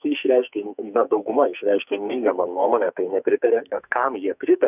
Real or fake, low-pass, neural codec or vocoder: fake; 3.6 kHz; codec, 44.1 kHz, 2.6 kbps, SNAC